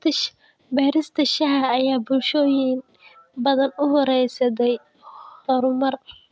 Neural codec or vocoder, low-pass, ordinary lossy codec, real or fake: none; none; none; real